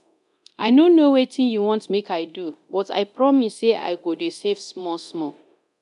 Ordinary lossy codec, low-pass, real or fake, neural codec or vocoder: none; 10.8 kHz; fake; codec, 24 kHz, 0.9 kbps, DualCodec